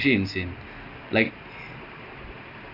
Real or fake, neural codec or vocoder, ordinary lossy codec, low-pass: real; none; AAC, 32 kbps; 5.4 kHz